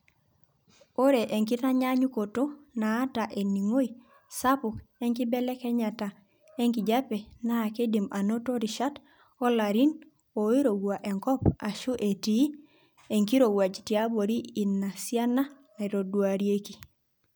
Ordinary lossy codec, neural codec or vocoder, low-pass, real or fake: none; none; none; real